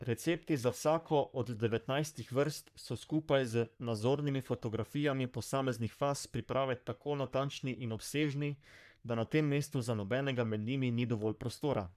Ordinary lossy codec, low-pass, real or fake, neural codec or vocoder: none; 14.4 kHz; fake; codec, 44.1 kHz, 3.4 kbps, Pupu-Codec